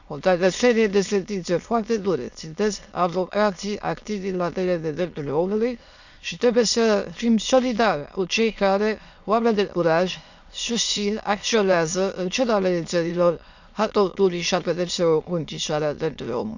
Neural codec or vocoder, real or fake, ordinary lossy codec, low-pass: autoencoder, 22.05 kHz, a latent of 192 numbers a frame, VITS, trained on many speakers; fake; none; 7.2 kHz